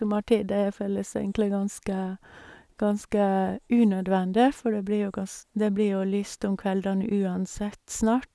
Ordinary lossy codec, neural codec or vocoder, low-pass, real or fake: none; none; none; real